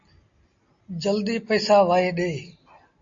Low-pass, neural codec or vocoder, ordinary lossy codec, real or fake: 7.2 kHz; none; AAC, 48 kbps; real